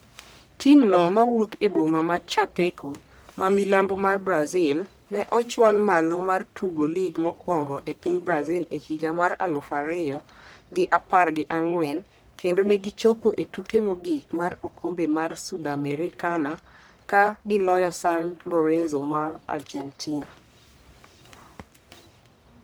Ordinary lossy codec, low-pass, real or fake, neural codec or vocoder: none; none; fake; codec, 44.1 kHz, 1.7 kbps, Pupu-Codec